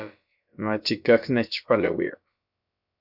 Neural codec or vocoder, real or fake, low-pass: codec, 16 kHz, about 1 kbps, DyCAST, with the encoder's durations; fake; 5.4 kHz